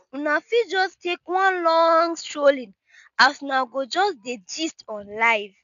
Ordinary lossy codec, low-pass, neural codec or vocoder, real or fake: none; 7.2 kHz; none; real